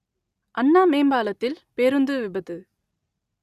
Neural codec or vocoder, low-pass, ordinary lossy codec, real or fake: none; 14.4 kHz; Opus, 64 kbps; real